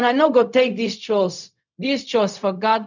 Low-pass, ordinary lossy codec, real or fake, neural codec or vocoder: 7.2 kHz; none; fake; codec, 16 kHz, 0.4 kbps, LongCat-Audio-Codec